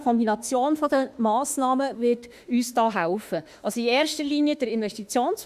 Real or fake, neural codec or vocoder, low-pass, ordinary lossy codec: fake; autoencoder, 48 kHz, 32 numbers a frame, DAC-VAE, trained on Japanese speech; 14.4 kHz; Opus, 64 kbps